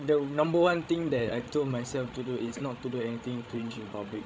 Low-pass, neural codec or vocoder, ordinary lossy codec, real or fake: none; codec, 16 kHz, 16 kbps, FreqCodec, larger model; none; fake